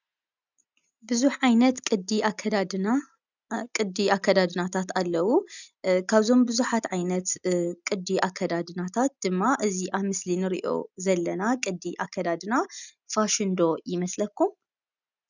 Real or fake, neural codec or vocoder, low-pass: real; none; 7.2 kHz